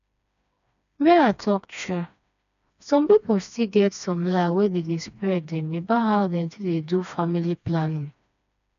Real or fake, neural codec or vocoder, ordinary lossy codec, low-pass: fake; codec, 16 kHz, 2 kbps, FreqCodec, smaller model; none; 7.2 kHz